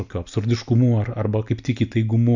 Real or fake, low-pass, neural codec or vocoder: real; 7.2 kHz; none